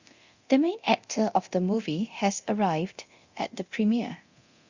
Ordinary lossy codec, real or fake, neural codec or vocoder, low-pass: Opus, 64 kbps; fake; codec, 24 kHz, 0.9 kbps, DualCodec; 7.2 kHz